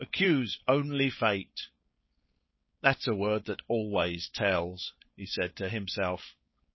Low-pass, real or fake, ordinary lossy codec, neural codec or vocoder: 7.2 kHz; fake; MP3, 24 kbps; codec, 16 kHz, 4.8 kbps, FACodec